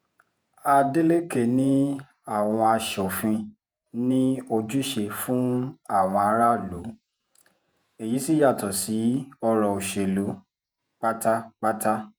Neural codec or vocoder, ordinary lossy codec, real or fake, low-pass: vocoder, 48 kHz, 128 mel bands, Vocos; none; fake; none